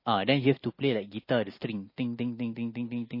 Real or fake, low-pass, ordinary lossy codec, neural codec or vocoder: real; 5.4 kHz; MP3, 24 kbps; none